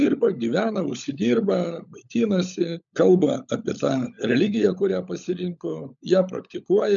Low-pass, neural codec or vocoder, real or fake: 7.2 kHz; codec, 16 kHz, 16 kbps, FunCodec, trained on LibriTTS, 50 frames a second; fake